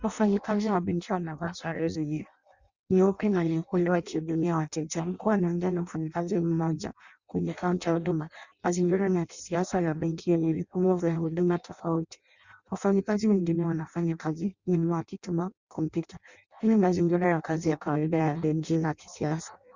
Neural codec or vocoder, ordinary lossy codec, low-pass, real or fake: codec, 16 kHz in and 24 kHz out, 0.6 kbps, FireRedTTS-2 codec; Opus, 64 kbps; 7.2 kHz; fake